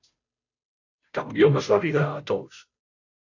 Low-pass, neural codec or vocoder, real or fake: 7.2 kHz; codec, 16 kHz, 0.5 kbps, FunCodec, trained on Chinese and English, 25 frames a second; fake